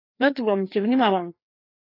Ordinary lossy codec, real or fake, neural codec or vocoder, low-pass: AAC, 32 kbps; fake; codec, 16 kHz, 2 kbps, FreqCodec, larger model; 5.4 kHz